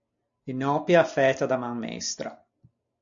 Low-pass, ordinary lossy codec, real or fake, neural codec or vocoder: 7.2 kHz; MP3, 64 kbps; real; none